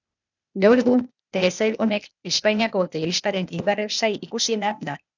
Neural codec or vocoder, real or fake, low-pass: codec, 16 kHz, 0.8 kbps, ZipCodec; fake; 7.2 kHz